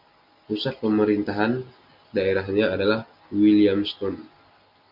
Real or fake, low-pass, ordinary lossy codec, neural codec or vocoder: real; 5.4 kHz; Opus, 64 kbps; none